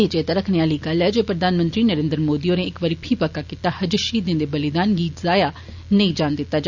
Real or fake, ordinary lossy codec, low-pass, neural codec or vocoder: real; none; 7.2 kHz; none